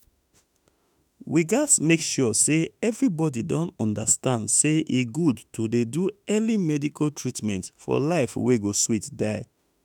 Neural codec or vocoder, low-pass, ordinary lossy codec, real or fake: autoencoder, 48 kHz, 32 numbers a frame, DAC-VAE, trained on Japanese speech; none; none; fake